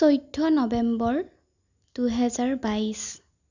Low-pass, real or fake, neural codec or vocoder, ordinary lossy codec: 7.2 kHz; real; none; none